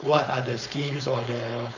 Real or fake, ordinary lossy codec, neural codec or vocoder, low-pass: fake; none; codec, 16 kHz, 4.8 kbps, FACodec; 7.2 kHz